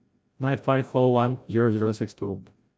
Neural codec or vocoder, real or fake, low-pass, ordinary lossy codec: codec, 16 kHz, 0.5 kbps, FreqCodec, larger model; fake; none; none